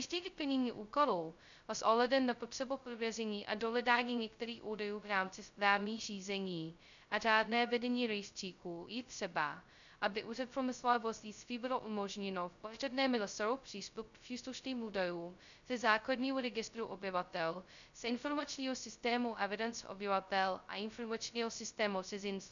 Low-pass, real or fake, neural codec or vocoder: 7.2 kHz; fake; codec, 16 kHz, 0.2 kbps, FocalCodec